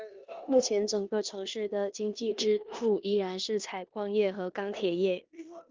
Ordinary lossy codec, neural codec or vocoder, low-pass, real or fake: Opus, 32 kbps; codec, 16 kHz in and 24 kHz out, 0.9 kbps, LongCat-Audio-Codec, four codebook decoder; 7.2 kHz; fake